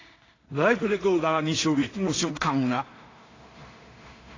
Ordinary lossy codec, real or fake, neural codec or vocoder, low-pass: AAC, 32 kbps; fake; codec, 16 kHz in and 24 kHz out, 0.4 kbps, LongCat-Audio-Codec, two codebook decoder; 7.2 kHz